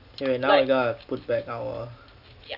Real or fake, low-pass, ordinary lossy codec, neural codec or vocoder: real; 5.4 kHz; none; none